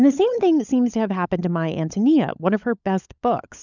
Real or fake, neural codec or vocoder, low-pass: fake; codec, 16 kHz, 16 kbps, FunCodec, trained on LibriTTS, 50 frames a second; 7.2 kHz